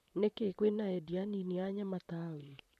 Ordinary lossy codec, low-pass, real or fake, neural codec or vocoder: none; 14.4 kHz; fake; vocoder, 44.1 kHz, 128 mel bands, Pupu-Vocoder